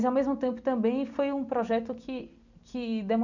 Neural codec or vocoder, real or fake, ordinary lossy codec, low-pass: none; real; none; 7.2 kHz